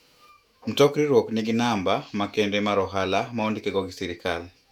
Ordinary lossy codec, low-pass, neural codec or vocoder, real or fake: none; 19.8 kHz; autoencoder, 48 kHz, 128 numbers a frame, DAC-VAE, trained on Japanese speech; fake